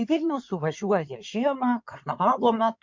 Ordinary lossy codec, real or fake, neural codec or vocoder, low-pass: MP3, 64 kbps; fake; codec, 16 kHz in and 24 kHz out, 2.2 kbps, FireRedTTS-2 codec; 7.2 kHz